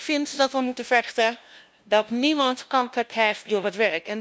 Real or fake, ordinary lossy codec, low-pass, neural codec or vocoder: fake; none; none; codec, 16 kHz, 0.5 kbps, FunCodec, trained on LibriTTS, 25 frames a second